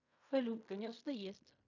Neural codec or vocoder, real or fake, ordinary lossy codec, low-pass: codec, 16 kHz in and 24 kHz out, 0.4 kbps, LongCat-Audio-Codec, fine tuned four codebook decoder; fake; AAC, 48 kbps; 7.2 kHz